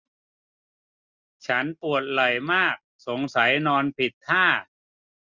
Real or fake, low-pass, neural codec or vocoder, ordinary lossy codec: real; 7.2 kHz; none; Opus, 64 kbps